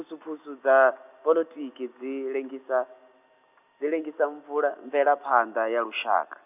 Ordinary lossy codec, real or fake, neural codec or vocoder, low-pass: none; real; none; 3.6 kHz